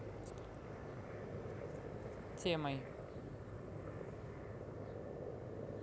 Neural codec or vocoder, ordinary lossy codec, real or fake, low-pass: none; none; real; none